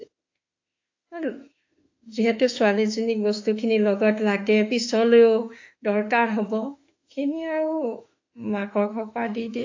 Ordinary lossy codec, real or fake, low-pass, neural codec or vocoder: AAC, 48 kbps; fake; 7.2 kHz; autoencoder, 48 kHz, 32 numbers a frame, DAC-VAE, trained on Japanese speech